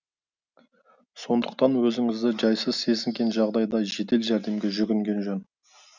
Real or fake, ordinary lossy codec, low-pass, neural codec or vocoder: real; none; 7.2 kHz; none